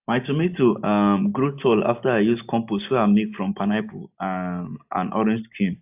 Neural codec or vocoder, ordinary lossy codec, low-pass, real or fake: none; AAC, 32 kbps; 3.6 kHz; real